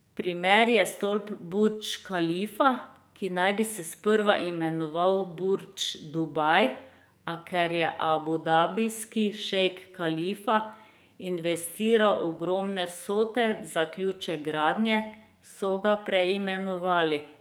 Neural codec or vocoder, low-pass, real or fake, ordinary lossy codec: codec, 44.1 kHz, 2.6 kbps, SNAC; none; fake; none